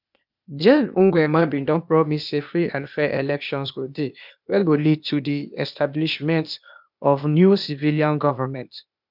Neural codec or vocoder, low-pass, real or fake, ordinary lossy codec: codec, 16 kHz, 0.8 kbps, ZipCodec; 5.4 kHz; fake; none